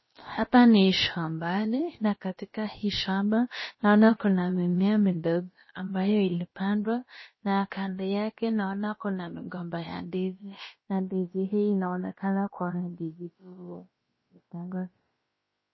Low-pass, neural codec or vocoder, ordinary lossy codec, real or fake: 7.2 kHz; codec, 16 kHz, about 1 kbps, DyCAST, with the encoder's durations; MP3, 24 kbps; fake